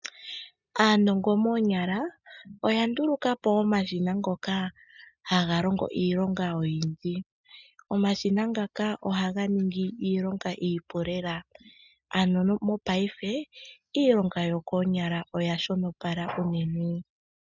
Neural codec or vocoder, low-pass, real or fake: none; 7.2 kHz; real